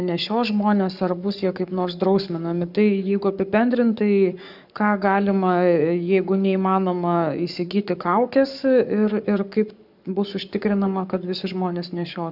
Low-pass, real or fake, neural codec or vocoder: 5.4 kHz; fake; codec, 44.1 kHz, 7.8 kbps, Pupu-Codec